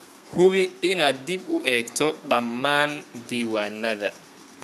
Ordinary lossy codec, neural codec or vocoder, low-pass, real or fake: none; codec, 32 kHz, 1.9 kbps, SNAC; 14.4 kHz; fake